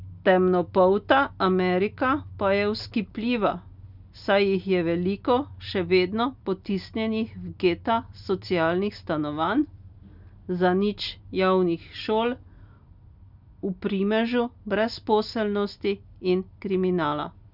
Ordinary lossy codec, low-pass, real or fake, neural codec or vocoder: none; 5.4 kHz; real; none